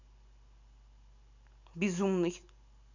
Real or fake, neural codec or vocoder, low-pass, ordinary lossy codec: real; none; 7.2 kHz; none